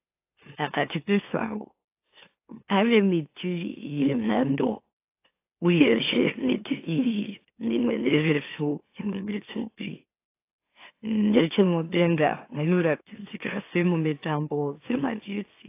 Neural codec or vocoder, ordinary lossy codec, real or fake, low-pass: autoencoder, 44.1 kHz, a latent of 192 numbers a frame, MeloTTS; AAC, 24 kbps; fake; 3.6 kHz